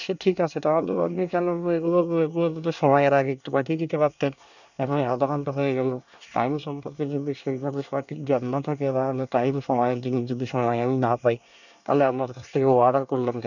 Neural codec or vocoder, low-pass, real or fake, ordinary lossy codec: codec, 24 kHz, 1 kbps, SNAC; 7.2 kHz; fake; none